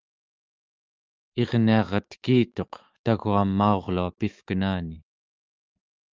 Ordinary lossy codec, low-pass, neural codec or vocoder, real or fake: Opus, 24 kbps; 7.2 kHz; autoencoder, 48 kHz, 128 numbers a frame, DAC-VAE, trained on Japanese speech; fake